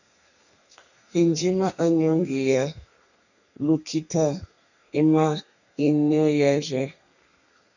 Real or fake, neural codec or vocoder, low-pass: fake; codec, 32 kHz, 1.9 kbps, SNAC; 7.2 kHz